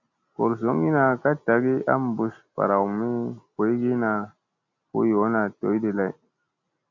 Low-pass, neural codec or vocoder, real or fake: 7.2 kHz; none; real